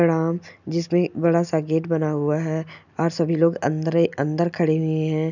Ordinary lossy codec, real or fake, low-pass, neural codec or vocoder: none; real; 7.2 kHz; none